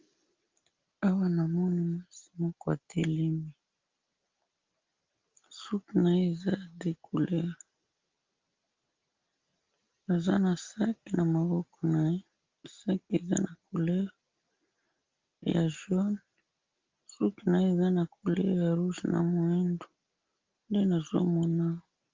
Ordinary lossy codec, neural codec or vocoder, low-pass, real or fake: Opus, 32 kbps; none; 7.2 kHz; real